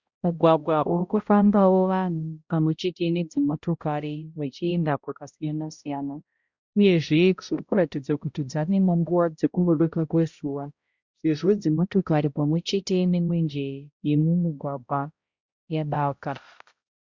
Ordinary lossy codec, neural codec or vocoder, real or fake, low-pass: Opus, 64 kbps; codec, 16 kHz, 0.5 kbps, X-Codec, HuBERT features, trained on balanced general audio; fake; 7.2 kHz